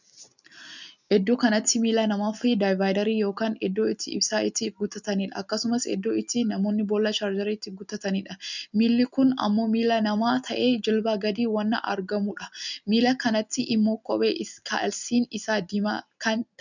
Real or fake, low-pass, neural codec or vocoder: real; 7.2 kHz; none